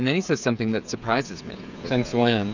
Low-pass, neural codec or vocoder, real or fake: 7.2 kHz; codec, 16 kHz, 8 kbps, FreqCodec, smaller model; fake